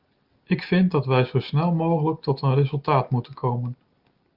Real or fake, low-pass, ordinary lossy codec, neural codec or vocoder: real; 5.4 kHz; Opus, 32 kbps; none